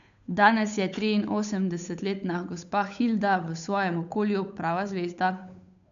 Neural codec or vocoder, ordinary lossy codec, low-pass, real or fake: codec, 16 kHz, 8 kbps, FunCodec, trained on Chinese and English, 25 frames a second; none; 7.2 kHz; fake